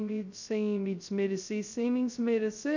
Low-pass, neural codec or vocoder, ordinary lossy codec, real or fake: 7.2 kHz; codec, 16 kHz, 0.2 kbps, FocalCodec; none; fake